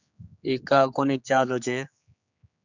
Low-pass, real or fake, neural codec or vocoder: 7.2 kHz; fake; codec, 16 kHz, 4 kbps, X-Codec, HuBERT features, trained on general audio